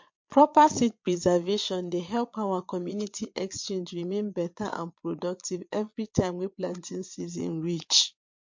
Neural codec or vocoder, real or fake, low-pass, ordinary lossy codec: vocoder, 22.05 kHz, 80 mel bands, Vocos; fake; 7.2 kHz; MP3, 48 kbps